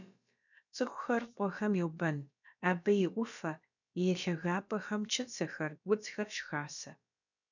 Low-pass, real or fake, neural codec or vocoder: 7.2 kHz; fake; codec, 16 kHz, about 1 kbps, DyCAST, with the encoder's durations